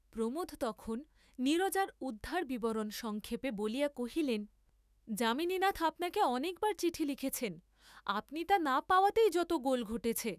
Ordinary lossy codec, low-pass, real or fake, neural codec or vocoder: none; 14.4 kHz; fake; autoencoder, 48 kHz, 128 numbers a frame, DAC-VAE, trained on Japanese speech